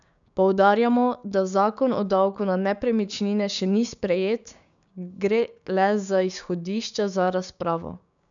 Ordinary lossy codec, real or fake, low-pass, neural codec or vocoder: none; fake; 7.2 kHz; codec, 16 kHz, 6 kbps, DAC